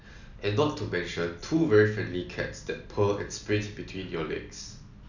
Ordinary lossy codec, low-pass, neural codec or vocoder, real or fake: none; 7.2 kHz; none; real